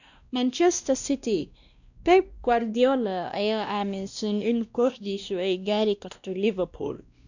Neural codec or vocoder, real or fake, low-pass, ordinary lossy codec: codec, 16 kHz, 1 kbps, X-Codec, WavLM features, trained on Multilingual LibriSpeech; fake; 7.2 kHz; none